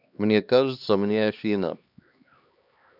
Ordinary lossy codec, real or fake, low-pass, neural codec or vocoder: none; fake; 5.4 kHz; codec, 16 kHz, 2 kbps, X-Codec, HuBERT features, trained on LibriSpeech